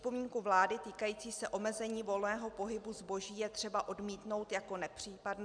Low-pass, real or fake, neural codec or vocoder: 9.9 kHz; real; none